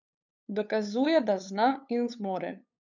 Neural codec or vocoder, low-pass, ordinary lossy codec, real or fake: codec, 16 kHz, 8 kbps, FunCodec, trained on LibriTTS, 25 frames a second; 7.2 kHz; none; fake